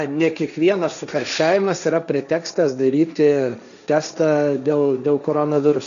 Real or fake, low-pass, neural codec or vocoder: fake; 7.2 kHz; codec, 16 kHz, 1.1 kbps, Voila-Tokenizer